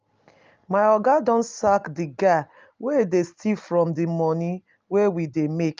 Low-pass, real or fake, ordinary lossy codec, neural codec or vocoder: 7.2 kHz; real; Opus, 24 kbps; none